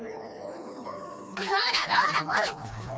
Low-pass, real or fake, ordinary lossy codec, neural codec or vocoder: none; fake; none; codec, 16 kHz, 2 kbps, FreqCodec, smaller model